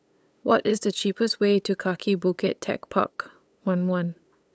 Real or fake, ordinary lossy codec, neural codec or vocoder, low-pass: fake; none; codec, 16 kHz, 8 kbps, FunCodec, trained on LibriTTS, 25 frames a second; none